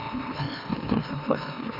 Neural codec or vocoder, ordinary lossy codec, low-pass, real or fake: autoencoder, 44.1 kHz, a latent of 192 numbers a frame, MeloTTS; none; 5.4 kHz; fake